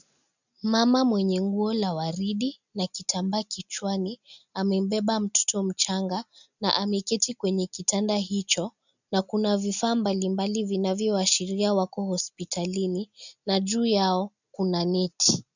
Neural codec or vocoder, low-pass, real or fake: none; 7.2 kHz; real